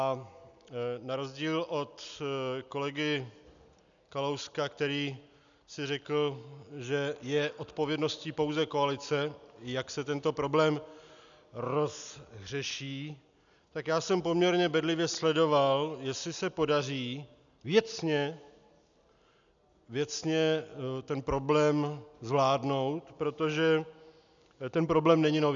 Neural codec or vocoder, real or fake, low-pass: none; real; 7.2 kHz